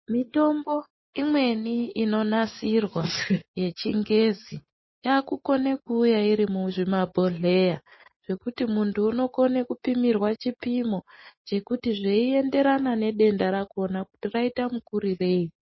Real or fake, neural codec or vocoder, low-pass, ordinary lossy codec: real; none; 7.2 kHz; MP3, 24 kbps